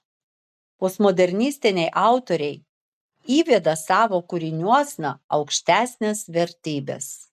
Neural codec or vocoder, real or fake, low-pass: none; real; 14.4 kHz